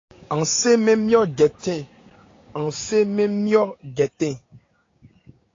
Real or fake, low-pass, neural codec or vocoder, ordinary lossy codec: fake; 7.2 kHz; codec, 16 kHz, 6 kbps, DAC; AAC, 32 kbps